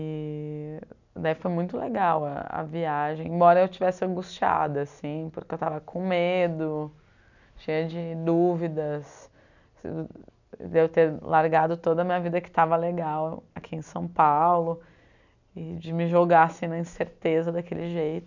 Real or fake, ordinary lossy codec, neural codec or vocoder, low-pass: real; none; none; 7.2 kHz